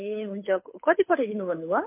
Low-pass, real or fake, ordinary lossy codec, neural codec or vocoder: 3.6 kHz; fake; MP3, 24 kbps; codec, 24 kHz, 6 kbps, HILCodec